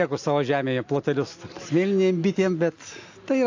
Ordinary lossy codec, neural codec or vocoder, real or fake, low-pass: AAC, 48 kbps; none; real; 7.2 kHz